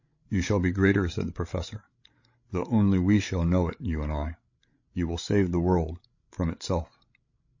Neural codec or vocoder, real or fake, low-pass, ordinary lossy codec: codec, 16 kHz, 16 kbps, FreqCodec, larger model; fake; 7.2 kHz; MP3, 32 kbps